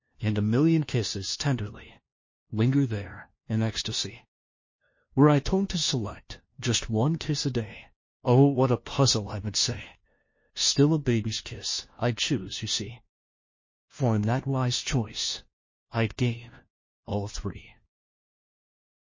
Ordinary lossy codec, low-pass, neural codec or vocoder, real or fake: MP3, 32 kbps; 7.2 kHz; codec, 16 kHz, 1 kbps, FunCodec, trained on LibriTTS, 50 frames a second; fake